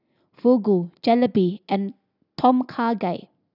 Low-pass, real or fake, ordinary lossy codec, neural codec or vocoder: 5.4 kHz; real; none; none